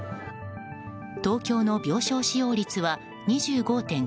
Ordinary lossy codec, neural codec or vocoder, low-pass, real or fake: none; none; none; real